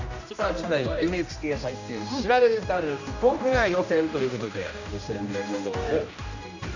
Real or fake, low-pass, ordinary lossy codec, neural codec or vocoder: fake; 7.2 kHz; none; codec, 16 kHz, 1 kbps, X-Codec, HuBERT features, trained on general audio